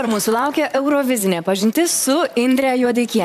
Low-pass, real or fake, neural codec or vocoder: 14.4 kHz; fake; vocoder, 44.1 kHz, 128 mel bands, Pupu-Vocoder